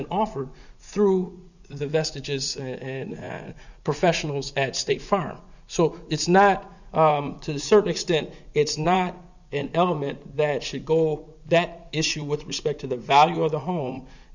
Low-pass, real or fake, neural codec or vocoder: 7.2 kHz; fake; vocoder, 44.1 kHz, 80 mel bands, Vocos